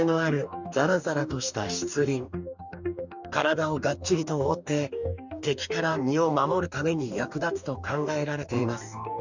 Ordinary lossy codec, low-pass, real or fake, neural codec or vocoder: none; 7.2 kHz; fake; codec, 44.1 kHz, 2.6 kbps, DAC